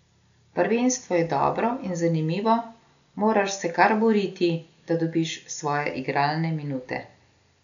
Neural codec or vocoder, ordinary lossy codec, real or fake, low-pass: none; none; real; 7.2 kHz